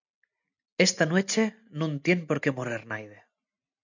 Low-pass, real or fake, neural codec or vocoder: 7.2 kHz; real; none